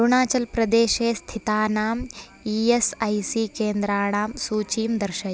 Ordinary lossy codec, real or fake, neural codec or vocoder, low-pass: none; real; none; none